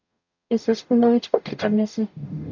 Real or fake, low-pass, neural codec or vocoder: fake; 7.2 kHz; codec, 44.1 kHz, 0.9 kbps, DAC